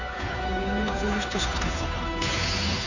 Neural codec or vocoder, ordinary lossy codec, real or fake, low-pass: codec, 16 kHz, 2 kbps, FunCodec, trained on Chinese and English, 25 frames a second; none; fake; 7.2 kHz